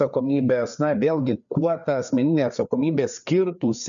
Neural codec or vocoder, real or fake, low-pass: codec, 16 kHz, 4 kbps, FreqCodec, larger model; fake; 7.2 kHz